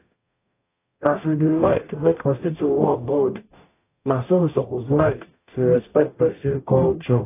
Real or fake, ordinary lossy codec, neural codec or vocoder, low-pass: fake; AAC, 24 kbps; codec, 44.1 kHz, 0.9 kbps, DAC; 3.6 kHz